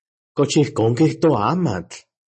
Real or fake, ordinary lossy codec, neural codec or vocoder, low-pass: real; MP3, 32 kbps; none; 9.9 kHz